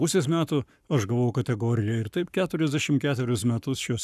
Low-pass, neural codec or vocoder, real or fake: 14.4 kHz; codec, 44.1 kHz, 7.8 kbps, Pupu-Codec; fake